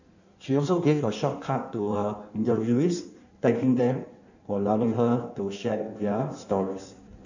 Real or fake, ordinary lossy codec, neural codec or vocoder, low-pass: fake; none; codec, 16 kHz in and 24 kHz out, 1.1 kbps, FireRedTTS-2 codec; 7.2 kHz